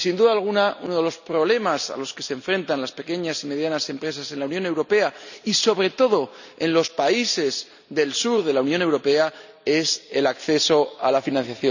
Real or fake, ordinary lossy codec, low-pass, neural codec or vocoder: real; none; 7.2 kHz; none